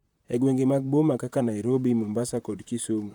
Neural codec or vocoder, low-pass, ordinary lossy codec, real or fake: vocoder, 44.1 kHz, 128 mel bands, Pupu-Vocoder; 19.8 kHz; none; fake